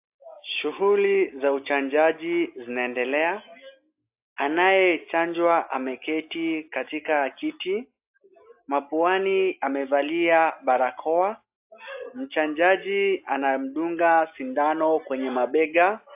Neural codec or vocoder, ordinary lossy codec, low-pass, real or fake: none; AAC, 32 kbps; 3.6 kHz; real